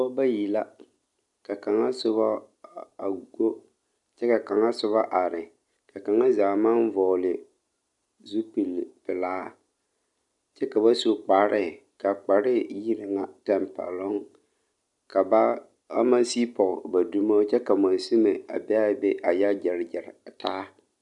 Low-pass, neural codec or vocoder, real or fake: 10.8 kHz; none; real